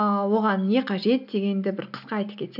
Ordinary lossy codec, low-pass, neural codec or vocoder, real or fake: none; 5.4 kHz; none; real